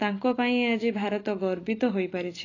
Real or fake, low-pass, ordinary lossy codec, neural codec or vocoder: real; 7.2 kHz; AAC, 32 kbps; none